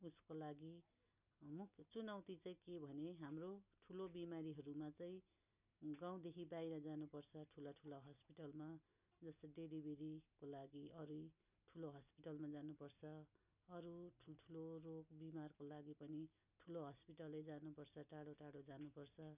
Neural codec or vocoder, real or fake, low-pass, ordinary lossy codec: none; real; 3.6 kHz; none